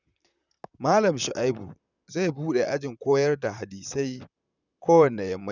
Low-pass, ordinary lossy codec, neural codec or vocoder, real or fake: 7.2 kHz; none; vocoder, 44.1 kHz, 128 mel bands, Pupu-Vocoder; fake